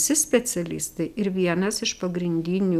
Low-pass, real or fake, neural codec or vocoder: 14.4 kHz; real; none